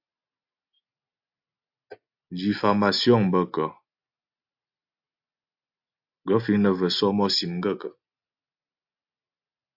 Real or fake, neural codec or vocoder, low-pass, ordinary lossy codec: real; none; 5.4 kHz; AAC, 48 kbps